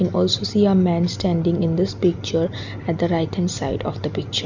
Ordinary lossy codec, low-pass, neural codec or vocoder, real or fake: none; 7.2 kHz; none; real